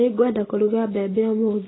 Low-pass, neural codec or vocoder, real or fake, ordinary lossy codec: 7.2 kHz; none; real; AAC, 16 kbps